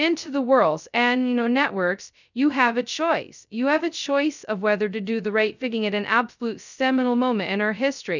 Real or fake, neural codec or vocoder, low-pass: fake; codec, 16 kHz, 0.2 kbps, FocalCodec; 7.2 kHz